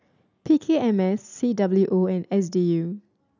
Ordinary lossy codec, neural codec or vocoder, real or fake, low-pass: none; none; real; 7.2 kHz